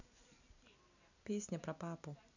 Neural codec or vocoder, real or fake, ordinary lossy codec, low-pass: none; real; none; 7.2 kHz